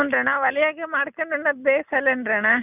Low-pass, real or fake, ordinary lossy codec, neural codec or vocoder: 3.6 kHz; real; none; none